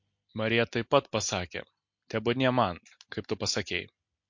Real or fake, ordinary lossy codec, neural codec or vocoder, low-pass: real; MP3, 48 kbps; none; 7.2 kHz